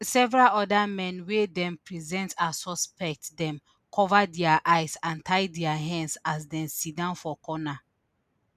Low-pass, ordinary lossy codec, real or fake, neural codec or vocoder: 14.4 kHz; none; real; none